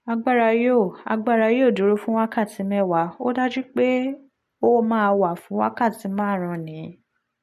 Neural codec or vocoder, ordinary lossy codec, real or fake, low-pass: none; MP3, 64 kbps; real; 14.4 kHz